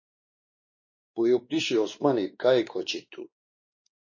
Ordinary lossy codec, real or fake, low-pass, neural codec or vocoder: MP3, 32 kbps; fake; 7.2 kHz; codec, 16 kHz, 2 kbps, X-Codec, WavLM features, trained on Multilingual LibriSpeech